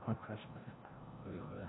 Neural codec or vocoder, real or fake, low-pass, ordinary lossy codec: codec, 16 kHz, 0.5 kbps, FreqCodec, larger model; fake; 7.2 kHz; AAC, 16 kbps